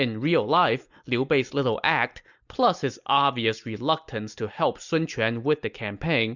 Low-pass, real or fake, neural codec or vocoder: 7.2 kHz; real; none